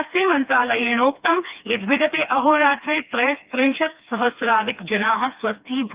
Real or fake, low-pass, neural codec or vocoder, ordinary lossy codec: fake; 3.6 kHz; codec, 16 kHz, 2 kbps, FreqCodec, smaller model; Opus, 32 kbps